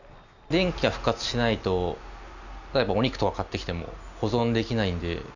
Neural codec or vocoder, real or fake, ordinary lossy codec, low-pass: none; real; none; 7.2 kHz